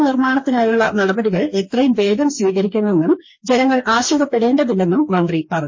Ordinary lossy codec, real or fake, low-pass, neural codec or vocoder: MP3, 32 kbps; fake; 7.2 kHz; codec, 44.1 kHz, 2.6 kbps, DAC